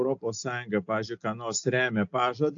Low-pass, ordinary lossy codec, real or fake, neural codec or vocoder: 7.2 kHz; AAC, 48 kbps; real; none